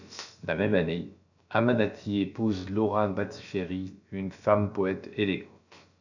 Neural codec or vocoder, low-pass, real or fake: codec, 16 kHz, about 1 kbps, DyCAST, with the encoder's durations; 7.2 kHz; fake